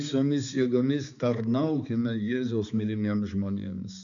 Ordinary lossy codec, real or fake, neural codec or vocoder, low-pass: MP3, 96 kbps; fake; codec, 16 kHz, 4 kbps, X-Codec, HuBERT features, trained on balanced general audio; 7.2 kHz